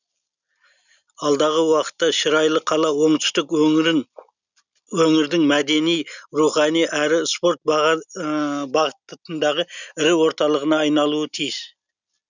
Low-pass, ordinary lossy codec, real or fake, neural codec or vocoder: 7.2 kHz; none; real; none